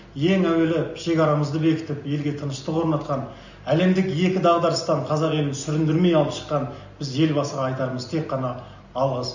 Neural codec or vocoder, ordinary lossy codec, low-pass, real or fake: none; none; 7.2 kHz; real